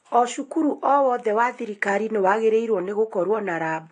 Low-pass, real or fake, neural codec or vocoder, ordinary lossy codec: 9.9 kHz; real; none; AAC, 48 kbps